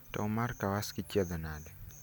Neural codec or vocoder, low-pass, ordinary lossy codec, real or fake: none; none; none; real